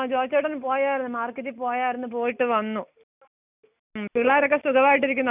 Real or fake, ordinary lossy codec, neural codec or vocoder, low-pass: real; none; none; 3.6 kHz